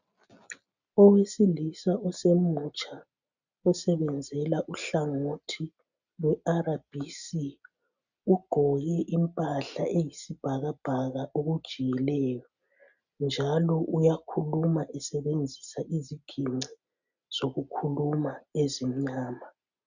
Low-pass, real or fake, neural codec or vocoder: 7.2 kHz; real; none